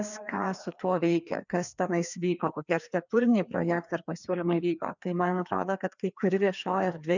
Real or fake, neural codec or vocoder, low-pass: fake; codec, 16 kHz, 2 kbps, FreqCodec, larger model; 7.2 kHz